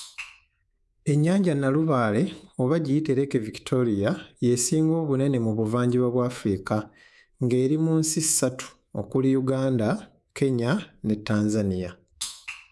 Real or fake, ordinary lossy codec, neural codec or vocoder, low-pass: fake; none; codec, 24 kHz, 3.1 kbps, DualCodec; none